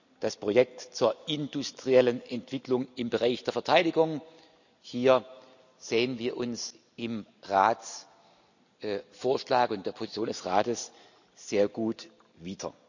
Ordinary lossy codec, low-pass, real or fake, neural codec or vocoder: none; 7.2 kHz; real; none